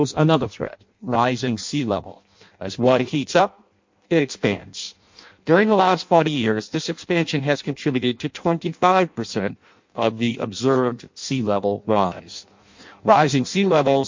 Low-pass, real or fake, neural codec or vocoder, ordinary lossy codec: 7.2 kHz; fake; codec, 16 kHz in and 24 kHz out, 0.6 kbps, FireRedTTS-2 codec; MP3, 48 kbps